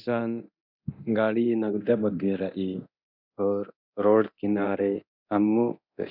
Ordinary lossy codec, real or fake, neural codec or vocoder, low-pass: none; fake; codec, 24 kHz, 0.9 kbps, DualCodec; 5.4 kHz